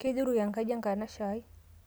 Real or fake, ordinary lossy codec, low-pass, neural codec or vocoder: real; none; none; none